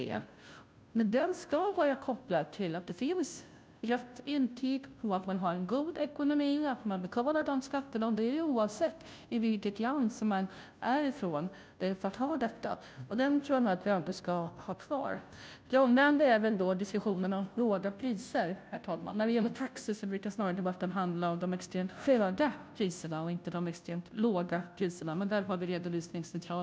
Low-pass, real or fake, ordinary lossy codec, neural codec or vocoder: none; fake; none; codec, 16 kHz, 0.5 kbps, FunCodec, trained on Chinese and English, 25 frames a second